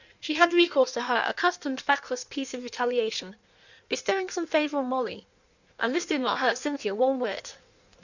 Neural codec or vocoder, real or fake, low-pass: codec, 16 kHz in and 24 kHz out, 1.1 kbps, FireRedTTS-2 codec; fake; 7.2 kHz